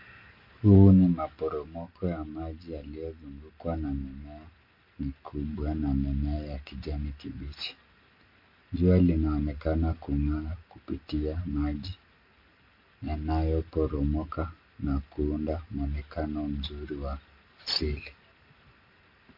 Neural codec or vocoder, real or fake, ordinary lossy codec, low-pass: none; real; MP3, 32 kbps; 5.4 kHz